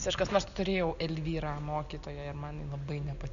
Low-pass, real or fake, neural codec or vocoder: 7.2 kHz; real; none